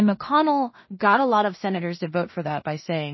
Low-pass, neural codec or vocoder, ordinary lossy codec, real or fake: 7.2 kHz; codec, 16 kHz in and 24 kHz out, 0.4 kbps, LongCat-Audio-Codec, two codebook decoder; MP3, 24 kbps; fake